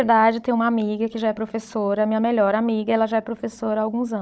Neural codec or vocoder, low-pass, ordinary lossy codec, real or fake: codec, 16 kHz, 16 kbps, FunCodec, trained on Chinese and English, 50 frames a second; none; none; fake